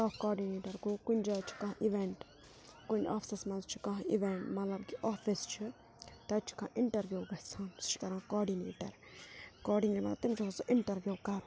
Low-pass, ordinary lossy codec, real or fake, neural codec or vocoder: none; none; real; none